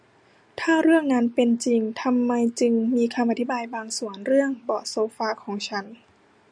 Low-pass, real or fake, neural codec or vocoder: 9.9 kHz; real; none